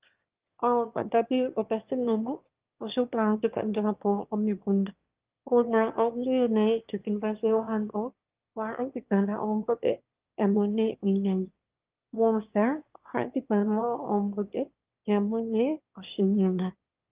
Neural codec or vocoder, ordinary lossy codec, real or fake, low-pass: autoencoder, 22.05 kHz, a latent of 192 numbers a frame, VITS, trained on one speaker; Opus, 32 kbps; fake; 3.6 kHz